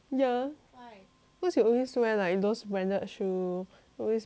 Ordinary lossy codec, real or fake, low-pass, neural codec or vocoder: none; real; none; none